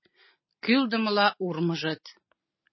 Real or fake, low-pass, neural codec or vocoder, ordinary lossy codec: real; 7.2 kHz; none; MP3, 24 kbps